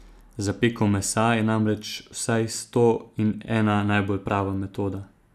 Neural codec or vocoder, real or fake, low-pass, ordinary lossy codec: none; real; 14.4 kHz; none